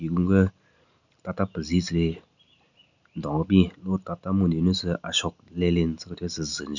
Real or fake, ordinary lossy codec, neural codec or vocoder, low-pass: real; none; none; 7.2 kHz